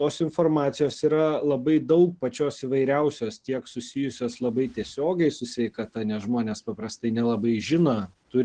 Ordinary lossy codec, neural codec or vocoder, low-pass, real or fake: Opus, 16 kbps; none; 9.9 kHz; real